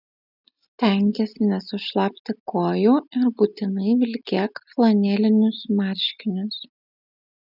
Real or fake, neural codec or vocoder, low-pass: real; none; 5.4 kHz